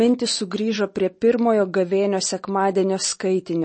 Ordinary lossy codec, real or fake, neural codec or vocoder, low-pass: MP3, 32 kbps; real; none; 9.9 kHz